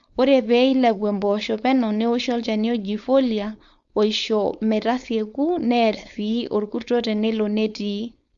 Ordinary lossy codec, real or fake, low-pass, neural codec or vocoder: Opus, 64 kbps; fake; 7.2 kHz; codec, 16 kHz, 4.8 kbps, FACodec